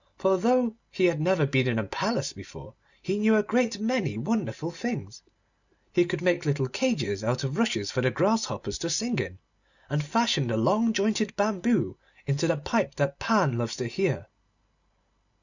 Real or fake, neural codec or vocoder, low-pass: real; none; 7.2 kHz